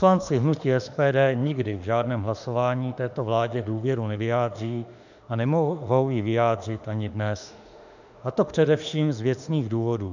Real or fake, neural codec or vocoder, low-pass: fake; autoencoder, 48 kHz, 32 numbers a frame, DAC-VAE, trained on Japanese speech; 7.2 kHz